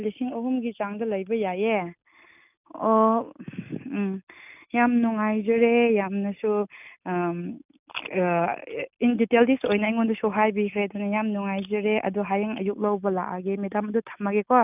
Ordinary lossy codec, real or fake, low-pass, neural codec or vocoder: none; real; 3.6 kHz; none